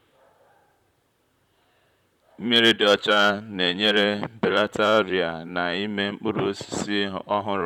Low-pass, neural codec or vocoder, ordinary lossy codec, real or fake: 19.8 kHz; vocoder, 44.1 kHz, 128 mel bands, Pupu-Vocoder; none; fake